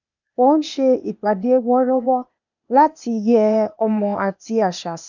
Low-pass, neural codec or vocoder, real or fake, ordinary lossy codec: 7.2 kHz; codec, 16 kHz, 0.8 kbps, ZipCodec; fake; none